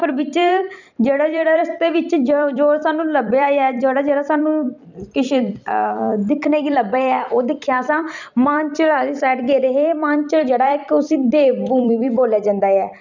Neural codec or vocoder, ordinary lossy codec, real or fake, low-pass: vocoder, 44.1 kHz, 128 mel bands every 256 samples, BigVGAN v2; none; fake; 7.2 kHz